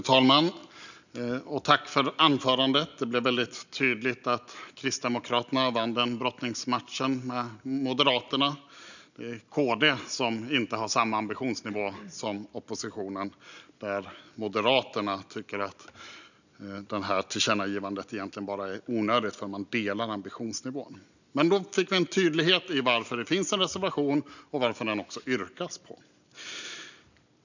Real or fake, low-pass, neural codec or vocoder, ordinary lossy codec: real; 7.2 kHz; none; none